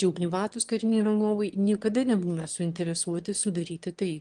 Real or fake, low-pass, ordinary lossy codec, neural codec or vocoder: fake; 9.9 kHz; Opus, 24 kbps; autoencoder, 22.05 kHz, a latent of 192 numbers a frame, VITS, trained on one speaker